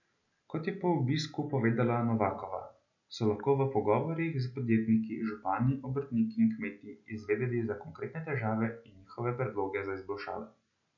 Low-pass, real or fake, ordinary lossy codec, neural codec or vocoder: 7.2 kHz; real; none; none